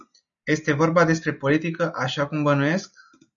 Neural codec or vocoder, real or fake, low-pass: none; real; 7.2 kHz